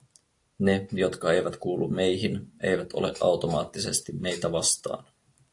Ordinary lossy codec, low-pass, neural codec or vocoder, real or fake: AAC, 48 kbps; 10.8 kHz; none; real